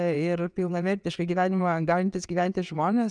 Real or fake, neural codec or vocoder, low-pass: fake; vocoder, 22.05 kHz, 80 mel bands, WaveNeXt; 9.9 kHz